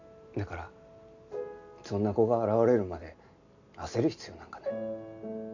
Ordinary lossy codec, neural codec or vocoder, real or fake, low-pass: none; none; real; 7.2 kHz